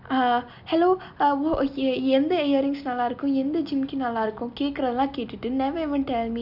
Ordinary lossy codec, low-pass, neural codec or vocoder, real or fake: none; 5.4 kHz; none; real